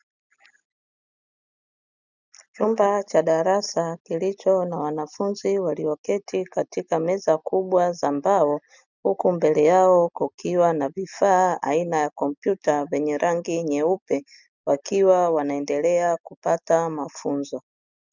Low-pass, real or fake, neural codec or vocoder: 7.2 kHz; real; none